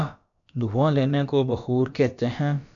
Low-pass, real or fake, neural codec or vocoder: 7.2 kHz; fake; codec, 16 kHz, about 1 kbps, DyCAST, with the encoder's durations